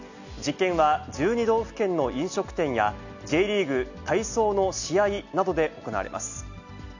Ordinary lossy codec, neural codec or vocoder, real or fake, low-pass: none; none; real; 7.2 kHz